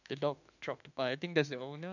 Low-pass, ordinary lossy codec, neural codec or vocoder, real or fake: 7.2 kHz; none; autoencoder, 48 kHz, 32 numbers a frame, DAC-VAE, trained on Japanese speech; fake